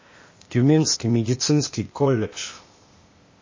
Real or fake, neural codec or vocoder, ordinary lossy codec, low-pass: fake; codec, 16 kHz, 0.8 kbps, ZipCodec; MP3, 32 kbps; 7.2 kHz